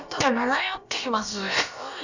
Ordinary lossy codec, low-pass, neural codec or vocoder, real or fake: Opus, 64 kbps; 7.2 kHz; codec, 16 kHz, about 1 kbps, DyCAST, with the encoder's durations; fake